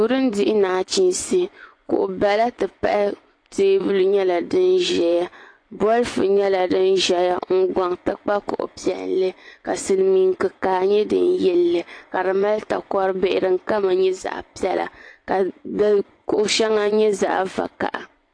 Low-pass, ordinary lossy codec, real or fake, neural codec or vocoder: 9.9 kHz; AAC, 48 kbps; fake; vocoder, 24 kHz, 100 mel bands, Vocos